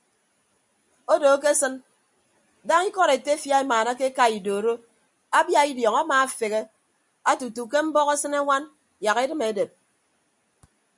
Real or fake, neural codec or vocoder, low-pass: real; none; 10.8 kHz